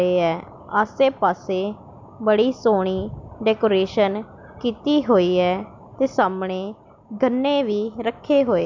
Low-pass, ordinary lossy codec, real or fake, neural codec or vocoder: 7.2 kHz; MP3, 64 kbps; real; none